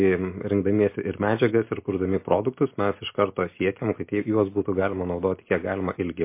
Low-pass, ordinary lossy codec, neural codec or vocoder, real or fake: 3.6 kHz; MP3, 24 kbps; none; real